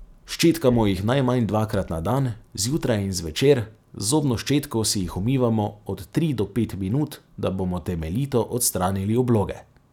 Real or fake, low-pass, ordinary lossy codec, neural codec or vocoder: real; 19.8 kHz; none; none